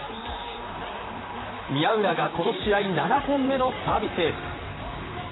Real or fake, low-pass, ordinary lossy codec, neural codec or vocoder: fake; 7.2 kHz; AAC, 16 kbps; vocoder, 44.1 kHz, 128 mel bands, Pupu-Vocoder